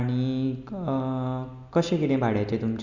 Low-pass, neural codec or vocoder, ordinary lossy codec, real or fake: 7.2 kHz; none; none; real